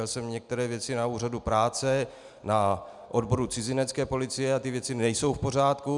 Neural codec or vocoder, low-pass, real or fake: none; 10.8 kHz; real